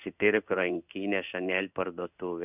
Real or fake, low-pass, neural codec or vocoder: fake; 3.6 kHz; codec, 16 kHz in and 24 kHz out, 1 kbps, XY-Tokenizer